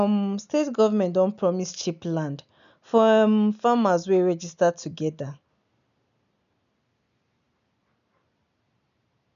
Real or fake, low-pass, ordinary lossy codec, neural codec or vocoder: real; 7.2 kHz; none; none